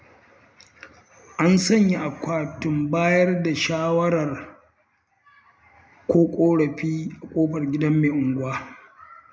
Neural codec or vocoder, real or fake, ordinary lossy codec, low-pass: none; real; none; none